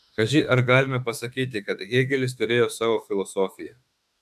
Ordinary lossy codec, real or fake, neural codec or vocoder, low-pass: AAC, 96 kbps; fake; autoencoder, 48 kHz, 32 numbers a frame, DAC-VAE, trained on Japanese speech; 14.4 kHz